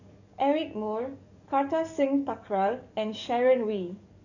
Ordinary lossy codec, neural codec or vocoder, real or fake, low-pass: none; codec, 44.1 kHz, 7.8 kbps, DAC; fake; 7.2 kHz